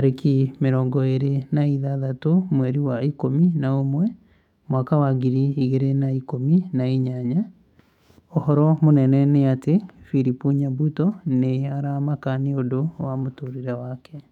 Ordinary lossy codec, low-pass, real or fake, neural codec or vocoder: none; 19.8 kHz; fake; autoencoder, 48 kHz, 128 numbers a frame, DAC-VAE, trained on Japanese speech